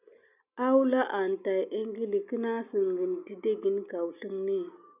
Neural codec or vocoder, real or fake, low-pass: none; real; 3.6 kHz